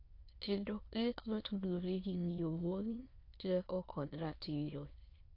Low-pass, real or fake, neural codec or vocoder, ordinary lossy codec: 5.4 kHz; fake; autoencoder, 22.05 kHz, a latent of 192 numbers a frame, VITS, trained on many speakers; AAC, 32 kbps